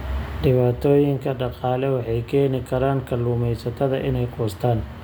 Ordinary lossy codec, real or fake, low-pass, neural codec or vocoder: none; real; none; none